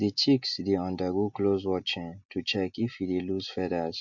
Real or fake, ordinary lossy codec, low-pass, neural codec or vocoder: real; MP3, 64 kbps; 7.2 kHz; none